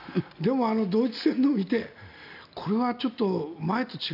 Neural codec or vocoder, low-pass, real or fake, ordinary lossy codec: none; 5.4 kHz; real; none